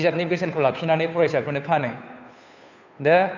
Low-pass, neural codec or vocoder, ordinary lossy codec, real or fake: 7.2 kHz; codec, 16 kHz, 2 kbps, FunCodec, trained on Chinese and English, 25 frames a second; none; fake